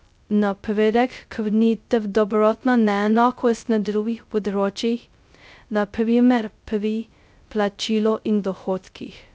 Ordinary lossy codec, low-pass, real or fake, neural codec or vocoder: none; none; fake; codec, 16 kHz, 0.2 kbps, FocalCodec